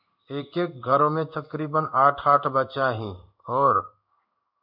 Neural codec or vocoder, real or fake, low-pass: codec, 16 kHz in and 24 kHz out, 1 kbps, XY-Tokenizer; fake; 5.4 kHz